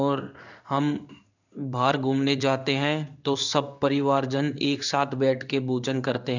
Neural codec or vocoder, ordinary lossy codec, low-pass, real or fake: codec, 16 kHz in and 24 kHz out, 1 kbps, XY-Tokenizer; none; 7.2 kHz; fake